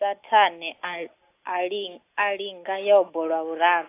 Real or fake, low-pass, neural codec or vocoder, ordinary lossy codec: real; 3.6 kHz; none; AAC, 24 kbps